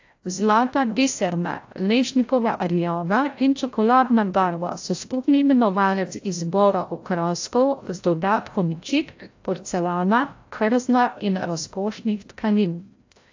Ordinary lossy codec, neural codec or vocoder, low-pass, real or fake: AAC, 48 kbps; codec, 16 kHz, 0.5 kbps, FreqCodec, larger model; 7.2 kHz; fake